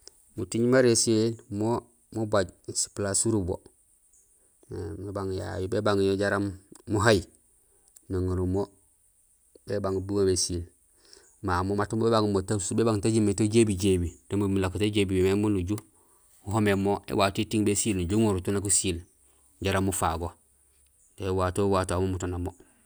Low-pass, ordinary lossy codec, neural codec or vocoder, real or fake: none; none; none; real